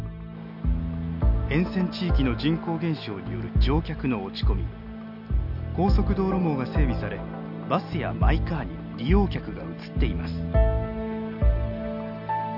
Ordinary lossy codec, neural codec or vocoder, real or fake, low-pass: none; none; real; 5.4 kHz